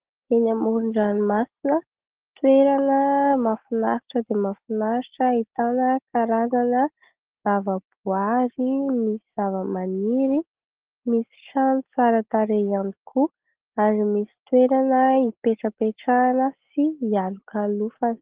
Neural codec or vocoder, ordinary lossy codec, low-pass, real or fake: none; Opus, 16 kbps; 3.6 kHz; real